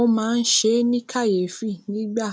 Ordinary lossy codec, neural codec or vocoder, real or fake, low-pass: none; none; real; none